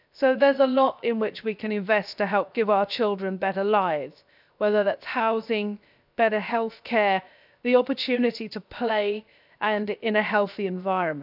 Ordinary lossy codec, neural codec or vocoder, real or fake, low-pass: none; codec, 16 kHz, 0.7 kbps, FocalCodec; fake; 5.4 kHz